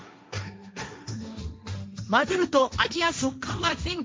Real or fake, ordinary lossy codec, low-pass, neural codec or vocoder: fake; none; none; codec, 16 kHz, 1.1 kbps, Voila-Tokenizer